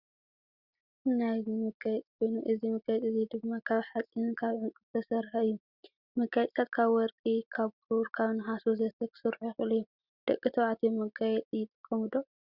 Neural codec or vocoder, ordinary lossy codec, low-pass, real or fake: none; Opus, 64 kbps; 5.4 kHz; real